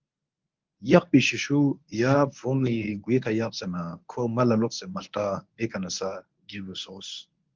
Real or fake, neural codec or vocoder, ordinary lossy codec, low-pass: fake; codec, 24 kHz, 0.9 kbps, WavTokenizer, medium speech release version 1; Opus, 32 kbps; 7.2 kHz